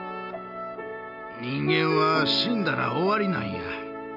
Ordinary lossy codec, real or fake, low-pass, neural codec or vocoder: none; real; 5.4 kHz; none